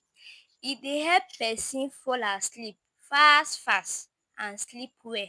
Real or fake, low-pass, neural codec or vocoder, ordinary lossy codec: real; none; none; none